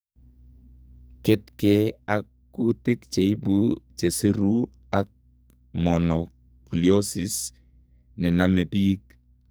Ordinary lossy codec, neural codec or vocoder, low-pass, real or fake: none; codec, 44.1 kHz, 2.6 kbps, SNAC; none; fake